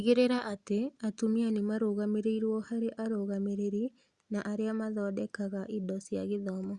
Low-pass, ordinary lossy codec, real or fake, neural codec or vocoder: 10.8 kHz; Opus, 64 kbps; real; none